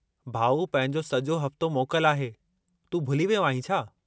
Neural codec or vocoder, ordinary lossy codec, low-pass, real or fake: none; none; none; real